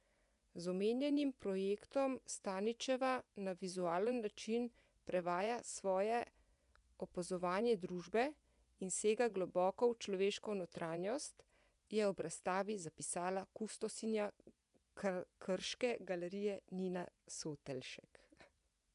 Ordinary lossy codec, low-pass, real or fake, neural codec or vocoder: none; 10.8 kHz; fake; vocoder, 24 kHz, 100 mel bands, Vocos